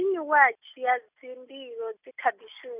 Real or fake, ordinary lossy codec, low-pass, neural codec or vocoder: real; none; 3.6 kHz; none